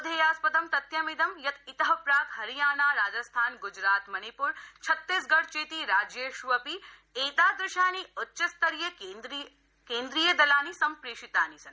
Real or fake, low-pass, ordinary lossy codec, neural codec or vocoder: real; none; none; none